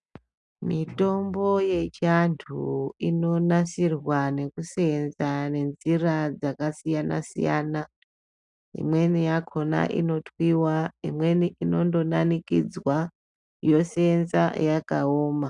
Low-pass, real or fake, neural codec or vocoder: 10.8 kHz; real; none